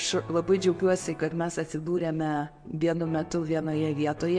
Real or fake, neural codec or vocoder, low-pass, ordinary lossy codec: real; none; 9.9 kHz; MP3, 48 kbps